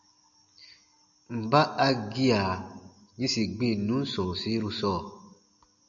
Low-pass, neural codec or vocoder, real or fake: 7.2 kHz; none; real